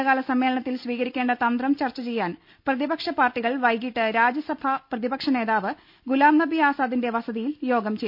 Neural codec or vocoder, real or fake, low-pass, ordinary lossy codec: none; real; 5.4 kHz; none